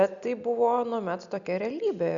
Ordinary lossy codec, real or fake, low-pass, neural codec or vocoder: Opus, 64 kbps; real; 7.2 kHz; none